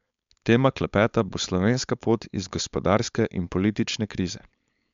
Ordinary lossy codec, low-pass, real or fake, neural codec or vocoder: MP3, 64 kbps; 7.2 kHz; fake; codec, 16 kHz, 4.8 kbps, FACodec